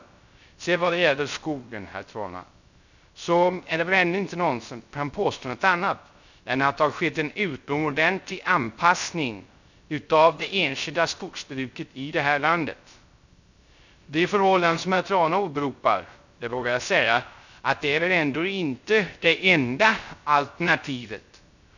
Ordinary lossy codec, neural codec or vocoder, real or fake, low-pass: none; codec, 16 kHz, 0.3 kbps, FocalCodec; fake; 7.2 kHz